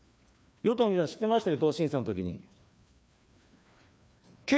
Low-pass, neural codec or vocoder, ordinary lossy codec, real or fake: none; codec, 16 kHz, 2 kbps, FreqCodec, larger model; none; fake